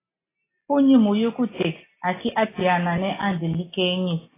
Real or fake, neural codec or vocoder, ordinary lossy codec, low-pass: real; none; AAC, 16 kbps; 3.6 kHz